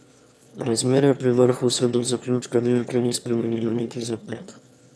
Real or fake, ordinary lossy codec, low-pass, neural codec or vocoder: fake; none; none; autoencoder, 22.05 kHz, a latent of 192 numbers a frame, VITS, trained on one speaker